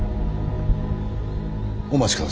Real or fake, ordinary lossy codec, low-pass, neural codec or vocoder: real; none; none; none